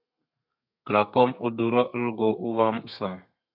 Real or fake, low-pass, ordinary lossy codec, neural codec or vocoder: fake; 5.4 kHz; MP3, 48 kbps; codec, 32 kHz, 1.9 kbps, SNAC